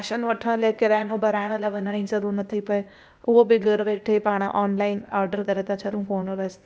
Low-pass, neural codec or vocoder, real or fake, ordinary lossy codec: none; codec, 16 kHz, 0.8 kbps, ZipCodec; fake; none